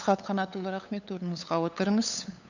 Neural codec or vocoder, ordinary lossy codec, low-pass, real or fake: codec, 16 kHz, 8 kbps, FunCodec, trained on LibriTTS, 25 frames a second; none; 7.2 kHz; fake